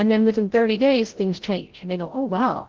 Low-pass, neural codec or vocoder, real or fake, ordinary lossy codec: 7.2 kHz; codec, 16 kHz, 0.5 kbps, FreqCodec, larger model; fake; Opus, 16 kbps